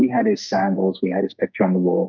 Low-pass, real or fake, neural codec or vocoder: 7.2 kHz; fake; codec, 44.1 kHz, 2.6 kbps, SNAC